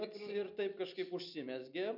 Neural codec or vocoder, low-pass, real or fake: none; 5.4 kHz; real